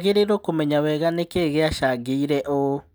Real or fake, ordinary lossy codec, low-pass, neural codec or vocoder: real; none; none; none